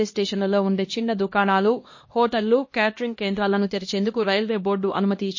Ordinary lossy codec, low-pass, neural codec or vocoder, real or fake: MP3, 32 kbps; 7.2 kHz; codec, 16 kHz, 1 kbps, X-Codec, HuBERT features, trained on LibriSpeech; fake